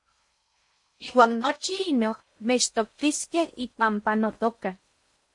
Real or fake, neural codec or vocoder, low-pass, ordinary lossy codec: fake; codec, 16 kHz in and 24 kHz out, 0.6 kbps, FocalCodec, streaming, 4096 codes; 10.8 kHz; MP3, 48 kbps